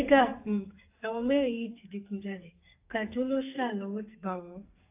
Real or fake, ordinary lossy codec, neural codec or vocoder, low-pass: fake; AAC, 32 kbps; codec, 44.1 kHz, 2.6 kbps, SNAC; 3.6 kHz